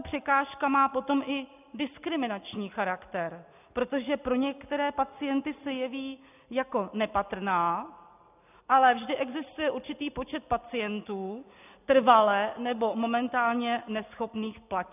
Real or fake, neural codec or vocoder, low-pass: real; none; 3.6 kHz